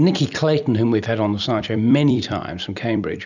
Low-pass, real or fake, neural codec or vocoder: 7.2 kHz; fake; vocoder, 44.1 kHz, 128 mel bands every 256 samples, BigVGAN v2